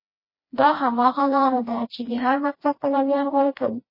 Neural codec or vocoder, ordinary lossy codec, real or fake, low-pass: codec, 16 kHz, 1 kbps, FreqCodec, smaller model; MP3, 24 kbps; fake; 5.4 kHz